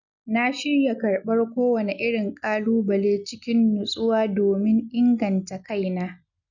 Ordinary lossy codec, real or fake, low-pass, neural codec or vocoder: none; real; 7.2 kHz; none